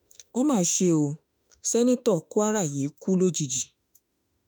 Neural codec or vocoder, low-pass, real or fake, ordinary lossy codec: autoencoder, 48 kHz, 32 numbers a frame, DAC-VAE, trained on Japanese speech; none; fake; none